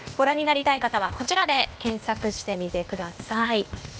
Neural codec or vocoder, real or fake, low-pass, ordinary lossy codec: codec, 16 kHz, 0.8 kbps, ZipCodec; fake; none; none